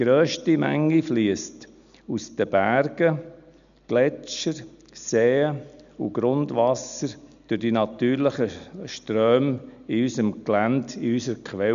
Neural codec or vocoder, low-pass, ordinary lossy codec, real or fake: none; 7.2 kHz; none; real